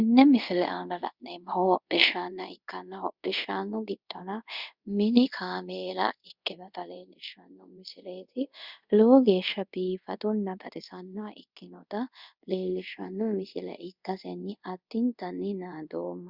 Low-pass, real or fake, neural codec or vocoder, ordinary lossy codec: 5.4 kHz; fake; codec, 24 kHz, 0.5 kbps, DualCodec; Opus, 64 kbps